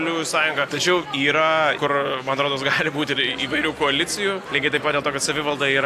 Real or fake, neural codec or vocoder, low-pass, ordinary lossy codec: real; none; 14.4 kHz; AAC, 64 kbps